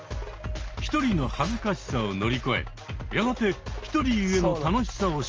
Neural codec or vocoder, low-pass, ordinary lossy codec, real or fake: none; 7.2 kHz; Opus, 24 kbps; real